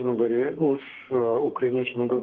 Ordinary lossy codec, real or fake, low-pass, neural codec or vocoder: Opus, 16 kbps; fake; 7.2 kHz; codec, 32 kHz, 1.9 kbps, SNAC